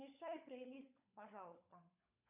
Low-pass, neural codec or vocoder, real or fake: 3.6 kHz; codec, 16 kHz, 16 kbps, FunCodec, trained on LibriTTS, 50 frames a second; fake